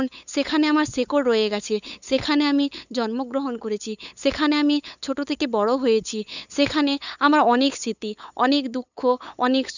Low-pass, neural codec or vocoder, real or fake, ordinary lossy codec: 7.2 kHz; codec, 16 kHz, 8 kbps, FunCodec, trained on LibriTTS, 25 frames a second; fake; none